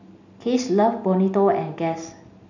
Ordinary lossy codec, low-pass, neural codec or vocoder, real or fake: none; 7.2 kHz; none; real